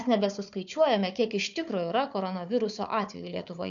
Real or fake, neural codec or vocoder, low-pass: fake; codec, 16 kHz, 4 kbps, FunCodec, trained on Chinese and English, 50 frames a second; 7.2 kHz